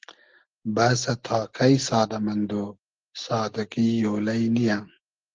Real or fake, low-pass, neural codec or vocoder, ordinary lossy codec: real; 7.2 kHz; none; Opus, 16 kbps